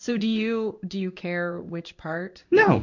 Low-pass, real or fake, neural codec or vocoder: 7.2 kHz; fake; codec, 16 kHz in and 24 kHz out, 1 kbps, XY-Tokenizer